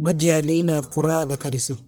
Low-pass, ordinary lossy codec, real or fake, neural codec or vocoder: none; none; fake; codec, 44.1 kHz, 1.7 kbps, Pupu-Codec